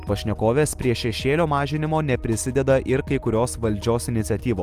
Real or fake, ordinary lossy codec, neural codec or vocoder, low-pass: real; Opus, 32 kbps; none; 14.4 kHz